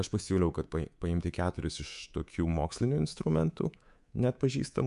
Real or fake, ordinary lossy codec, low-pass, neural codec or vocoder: fake; MP3, 96 kbps; 10.8 kHz; codec, 24 kHz, 3.1 kbps, DualCodec